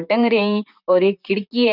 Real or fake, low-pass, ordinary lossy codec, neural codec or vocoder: fake; 5.4 kHz; none; vocoder, 44.1 kHz, 128 mel bands, Pupu-Vocoder